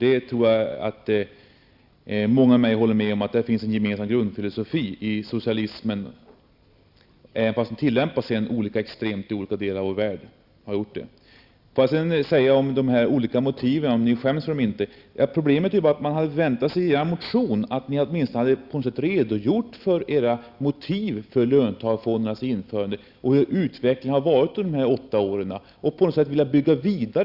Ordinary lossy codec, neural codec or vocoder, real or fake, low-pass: Opus, 64 kbps; none; real; 5.4 kHz